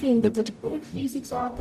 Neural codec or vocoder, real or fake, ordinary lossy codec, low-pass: codec, 44.1 kHz, 0.9 kbps, DAC; fake; none; 14.4 kHz